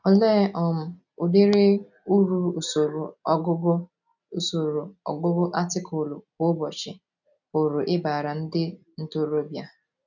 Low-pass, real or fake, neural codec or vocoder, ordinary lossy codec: 7.2 kHz; real; none; none